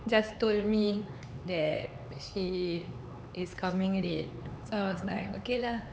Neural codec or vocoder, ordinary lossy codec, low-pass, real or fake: codec, 16 kHz, 4 kbps, X-Codec, HuBERT features, trained on LibriSpeech; none; none; fake